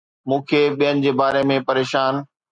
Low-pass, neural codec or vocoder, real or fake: 9.9 kHz; none; real